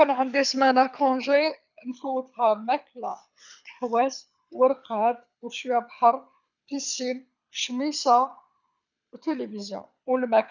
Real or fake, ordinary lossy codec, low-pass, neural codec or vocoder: fake; none; 7.2 kHz; codec, 24 kHz, 6 kbps, HILCodec